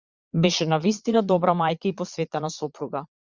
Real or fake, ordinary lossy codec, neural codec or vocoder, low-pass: fake; Opus, 64 kbps; vocoder, 22.05 kHz, 80 mel bands, Vocos; 7.2 kHz